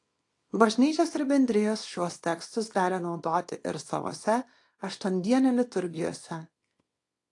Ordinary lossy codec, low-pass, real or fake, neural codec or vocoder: AAC, 48 kbps; 10.8 kHz; fake; codec, 24 kHz, 0.9 kbps, WavTokenizer, small release